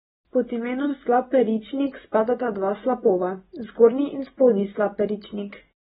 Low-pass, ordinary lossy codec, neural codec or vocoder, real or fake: 14.4 kHz; AAC, 16 kbps; none; real